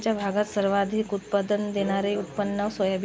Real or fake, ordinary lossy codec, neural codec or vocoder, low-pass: real; none; none; none